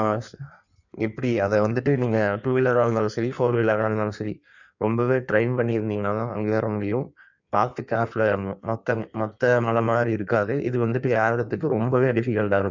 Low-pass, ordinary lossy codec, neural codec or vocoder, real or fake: 7.2 kHz; none; codec, 16 kHz in and 24 kHz out, 1.1 kbps, FireRedTTS-2 codec; fake